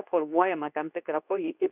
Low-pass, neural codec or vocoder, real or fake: 3.6 kHz; codec, 24 kHz, 0.9 kbps, WavTokenizer, medium speech release version 2; fake